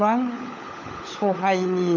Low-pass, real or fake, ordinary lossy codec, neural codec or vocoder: 7.2 kHz; fake; none; codec, 16 kHz, 16 kbps, FunCodec, trained on LibriTTS, 50 frames a second